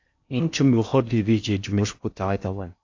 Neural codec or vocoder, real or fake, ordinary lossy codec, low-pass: codec, 16 kHz in and 24 kHz out, 0.6 kbps, FocalCodec, streaming, 4096 codes; fake; AAC, 48 kbps; 7.2 kHz